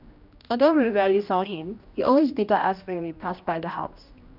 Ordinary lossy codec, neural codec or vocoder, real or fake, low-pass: none; codec, 16 kHz, 1 kbps, X-Codec, HuBERT features, trained on general audio; fake; 5.4 kHz